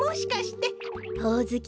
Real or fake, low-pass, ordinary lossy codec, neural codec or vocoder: real; none; none; none